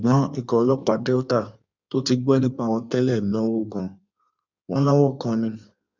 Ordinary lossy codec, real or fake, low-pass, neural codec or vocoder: none; fake; 7.2 kHz; codec, 16 kHz in and 24 kHz out, 1.1 kbps, FireRedTTS-2 codec